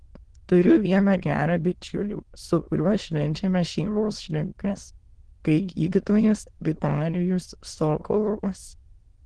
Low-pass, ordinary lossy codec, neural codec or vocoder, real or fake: 9.9 kHz; Opus, 16 kbps; autoencoder, 22.05 kHz, a latent of 192 numbers a frame, VITS, trained on many speakers; fake